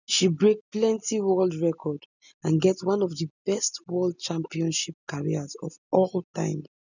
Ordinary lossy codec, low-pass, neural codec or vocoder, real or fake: none; 7.2 kHz; none; real